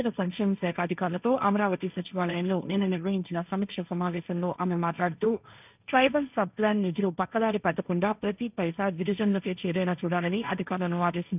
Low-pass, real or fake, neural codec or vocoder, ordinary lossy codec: 3.6 kHz; fake; codec, 16 kHz, 1.1 kbps, Voila-Tokenizer; none